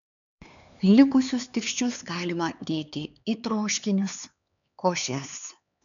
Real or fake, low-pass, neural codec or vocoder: fake; 7.2 kHz; codec, 16 kHz, 4 kbps, X-Codec, HuBERT features, trained on LibriSpeech